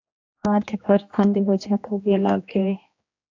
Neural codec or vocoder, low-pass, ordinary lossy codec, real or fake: codec, 16 kHz, 1 kbps, X-Codec, HuBERT features, trained on general audio; 7.2 kHz; AAC, 48 kbps; fake